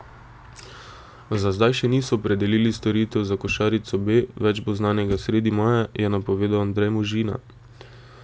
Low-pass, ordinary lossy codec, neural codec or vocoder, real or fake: none; none; none; real